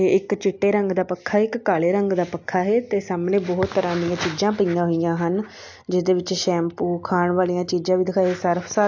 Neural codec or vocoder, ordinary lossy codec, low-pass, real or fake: none; AAC, 48 kbps; 7.2 kHz; real